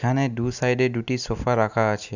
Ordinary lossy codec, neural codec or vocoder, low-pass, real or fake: none; none; 7.2 kHz; real